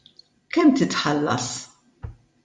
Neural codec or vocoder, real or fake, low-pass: vocoder, 44.1 kHz, 128 mel bands every 256 samples, BigVGAN v2; fake; 10.8 kHz